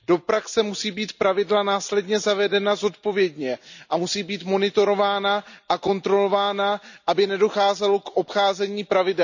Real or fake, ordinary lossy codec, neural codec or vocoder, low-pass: real; none; none; 7.2 kHz